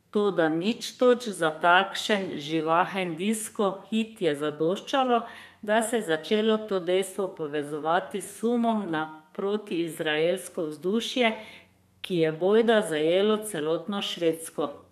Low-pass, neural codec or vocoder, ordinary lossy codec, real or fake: 14.4 kHz; codec, 32 kHz, 1.9 kbps, SNAC; none; fake